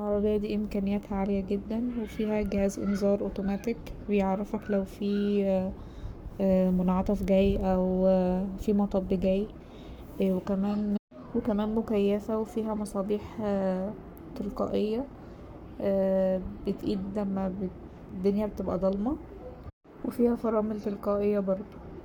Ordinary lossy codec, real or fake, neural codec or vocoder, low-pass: none; fake; codec, 44.1 kHz, 7.8 kbps, Pupu-Codec; none